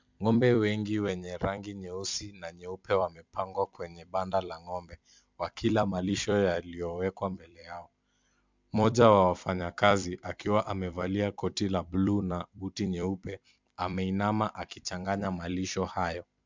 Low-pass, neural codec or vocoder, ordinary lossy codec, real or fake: 7.2 kHz; vocoder, 44.1 kHz, 128 mel bands every 256 samples, BigVGAN v2; MP3, 64 kbps; fake